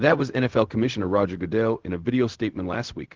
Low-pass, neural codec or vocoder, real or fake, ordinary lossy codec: 7.2 kHz; codec, 16 kHz, 0.4 kbps, LongCat-Audio-Codec; fake; Opus, 16 kbps